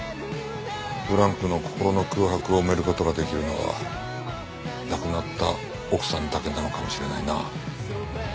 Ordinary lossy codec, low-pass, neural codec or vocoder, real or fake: none; none; none; real